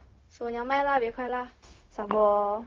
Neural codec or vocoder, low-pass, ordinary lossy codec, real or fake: codec, 16 kHz, 0.4 kbps, LongCat-Audio-Codec; 7.2 kHz; Opus, 32 kbps; fake